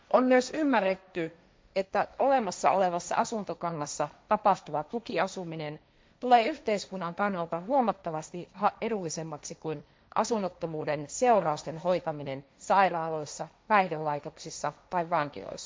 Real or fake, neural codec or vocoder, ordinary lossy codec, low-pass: fake; codec, 16 kHz, 1.1 kbps, Voila-Tokenizer; none; none